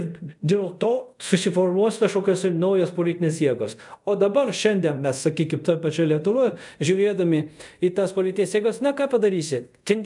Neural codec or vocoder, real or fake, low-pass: codec, 24 kHz, 0.5 kbps, DualCodec; fake; 10.8 kHz